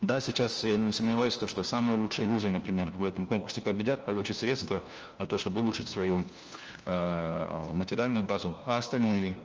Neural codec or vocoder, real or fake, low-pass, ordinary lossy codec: codec, 16 kHz, 1 kbps, FunCodec, trained on LibriTTS, 50 frames a second; fake; 7.2 kHz; Opus, 24 kbps